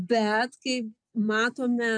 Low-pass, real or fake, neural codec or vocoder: 9.9 kHz; real; none